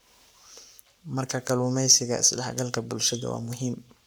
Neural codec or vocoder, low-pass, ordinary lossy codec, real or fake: codec, 44.1 kHz, 7.8 kbps, Pupu-Codec; none; none; fake